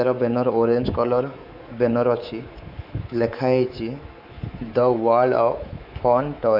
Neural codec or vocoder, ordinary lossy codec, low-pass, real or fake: codec, 24 kHz, 3.1 kbps, DualCodec; none; 5.4 kHz; fake